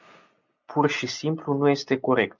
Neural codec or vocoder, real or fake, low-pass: none; real; 7.2 kHz